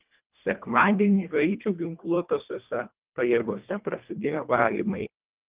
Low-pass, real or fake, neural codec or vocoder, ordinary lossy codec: 3.6 kHz; fake; codec, 24 kHz, 1.5 kbps, HILCodec; Opus, 24 kbps